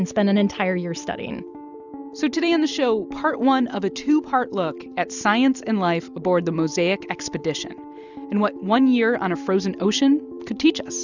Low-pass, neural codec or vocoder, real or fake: 7.2 kHz; none; real